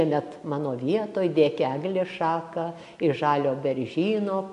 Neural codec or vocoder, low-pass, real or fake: none; 10.8 kHz; real